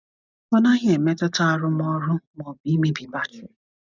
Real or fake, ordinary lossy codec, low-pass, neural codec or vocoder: real; none; 7.2 kHz; none